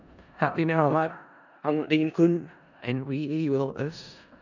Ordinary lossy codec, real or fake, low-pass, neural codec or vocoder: none; fake; 7.2 kHz; codec, 16 kHz in and 24 kHz out, 0.4 kbps, LongCat-Audio-Codec, four codebook decoder